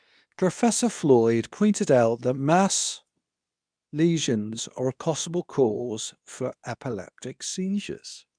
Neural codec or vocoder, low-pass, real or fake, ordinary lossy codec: codec, 24 kHz, 0.9 kbps, WavTokenizer, medium speech release version 2; 9.9 kHz; fake; none